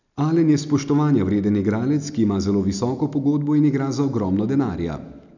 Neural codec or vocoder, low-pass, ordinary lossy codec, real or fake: none; 7.2 kHz; none; real